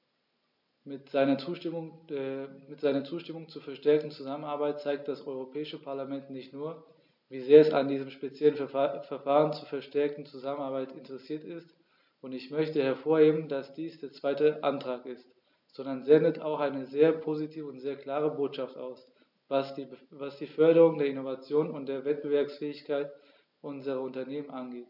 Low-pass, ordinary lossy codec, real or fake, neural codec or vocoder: 5.4 kHz; none; real; none